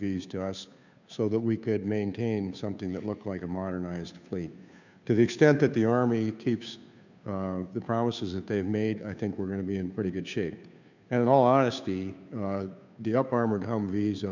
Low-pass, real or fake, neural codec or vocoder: 7.2 kHz; fake; codec, 16 kHz, 2 kbps, FunCodec, trained on Chinese and English, 25 frames a second